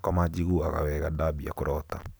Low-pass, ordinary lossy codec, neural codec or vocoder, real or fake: none; none; none; real